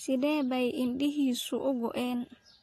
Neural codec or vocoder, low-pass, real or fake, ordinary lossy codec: none; 14.4 kHz; real; AAC, 48 kbps